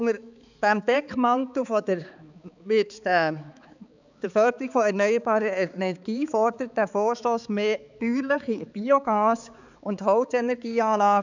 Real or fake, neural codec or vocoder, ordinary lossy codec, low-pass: fake; codec, 16 kHz, 4 kbps, X-Codec, HuBERT features, trained on balanced general audio; none; 7.2 kHz